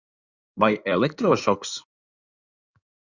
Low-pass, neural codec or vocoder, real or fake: 7.2 kHz; codec, 16 kHz in and 24 kHz out, 2.2 kbps, FireRedTTS-2 codec; fake